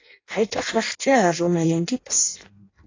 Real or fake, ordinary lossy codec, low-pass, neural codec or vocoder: fake; AAC, 32 kbps; 7.2 kHz; codec, 16 kHz in and 24 kHz out, 0.6 kbps, FireRedTTS-2 codec